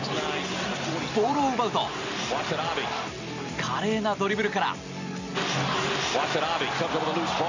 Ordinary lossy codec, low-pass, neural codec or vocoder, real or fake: none; 7.2 kHz; none; real